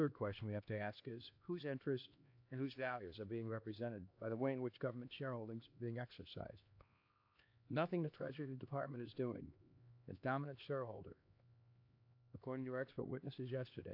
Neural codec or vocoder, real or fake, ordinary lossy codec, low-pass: codec, 16 kHz, 2 kbps, X-Codec, HuBERT features, trained on LibriSpeech; fake; AAC, 48 kbps; 5.4 kHz